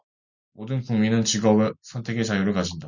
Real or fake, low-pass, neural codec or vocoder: real; 7.2 kHz; none